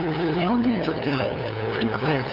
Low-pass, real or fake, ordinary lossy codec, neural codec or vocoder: 5.4 kHz; fake; none; codec, 16 kHz, 2 kbps, FunCodec, trained on LibriTTS, 25 frames a second